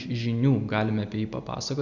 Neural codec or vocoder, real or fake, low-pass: none; real; 7.2 kHz